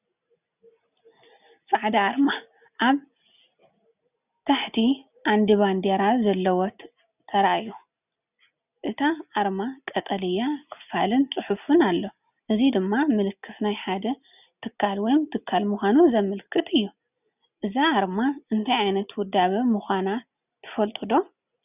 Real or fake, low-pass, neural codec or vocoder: real; 3.6 kHz; none